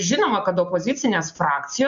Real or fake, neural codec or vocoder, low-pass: real; none; 7.2 kHz